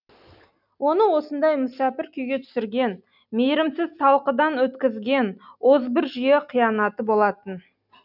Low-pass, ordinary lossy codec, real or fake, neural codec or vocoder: 5.4 kHz; none; real; none